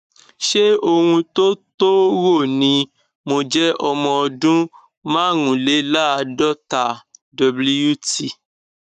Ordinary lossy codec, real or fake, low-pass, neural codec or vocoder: none; fake; 14.4 kHz; codec, 44.1 kHz, 7.8 kbps, Pupu-Codec